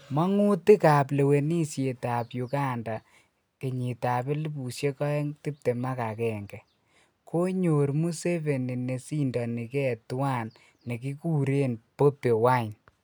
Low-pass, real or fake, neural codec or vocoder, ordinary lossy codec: none; real; none; none